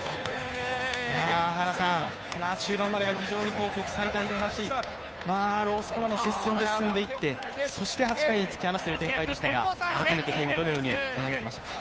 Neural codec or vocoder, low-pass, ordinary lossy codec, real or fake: codec, 16 kHz, 2 kbps, FunCodec, trained on Chinese and English, 25 frames a second; none; none; fake